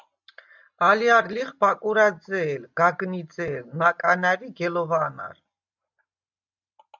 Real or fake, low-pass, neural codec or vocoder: real; 7.2 kHz; none